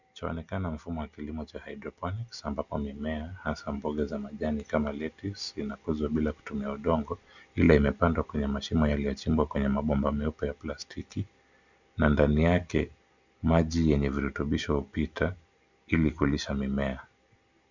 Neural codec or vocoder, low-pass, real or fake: none; 7.2 kHz; real